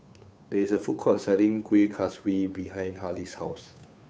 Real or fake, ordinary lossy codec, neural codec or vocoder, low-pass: fake; none; codec, 16 kHz, 2 kbps, FunCodec, trained on Chinese and English, 25 frames a second; none